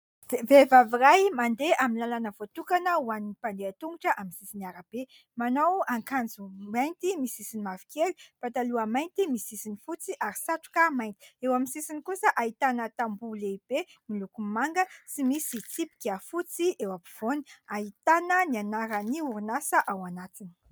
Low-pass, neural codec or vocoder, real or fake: 19.8 kHz; none; real